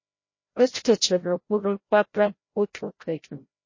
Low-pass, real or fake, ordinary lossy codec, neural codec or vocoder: 7.2 kHz; fake; MP3, 32 kbps; codec, 16 kHz, 0.5 kbps, FreqCodec, larger model